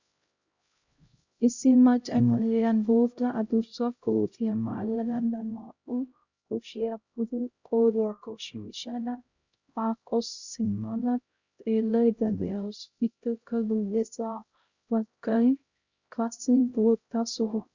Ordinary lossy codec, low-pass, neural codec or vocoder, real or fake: Opus, 64 kbps; 7.2 kHz; codec, 16 kHz, 0.5 kbps, X-Codec, HuBERT features, trained on LibriSpeech; fake